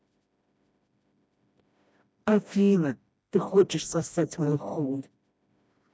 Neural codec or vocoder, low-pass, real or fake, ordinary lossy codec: codec, 16 kHz, 1 kbps, FreqCodec, smaller model; none; fake; none